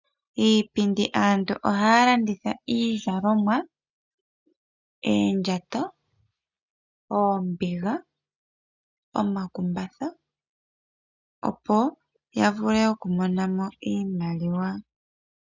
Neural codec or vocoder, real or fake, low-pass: none; real; 7.2 kHz